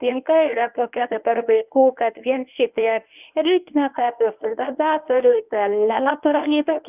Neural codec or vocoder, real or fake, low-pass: codec, 24 kHz, 0.9 kbps, WavTokenizer, medium speech release version 1; fake; 3.6 kHz